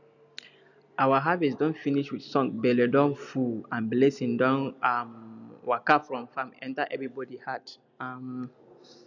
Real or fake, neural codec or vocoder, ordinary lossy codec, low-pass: real; none; none; 7.2 kHz